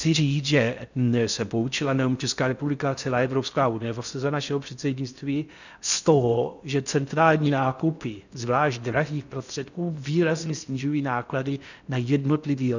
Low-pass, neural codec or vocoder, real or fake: 7.2 kHz; codec, 16 kHz in and 24 kHz out, 0.6 kbps, FocalCodec, streaming, 4096 codes; fake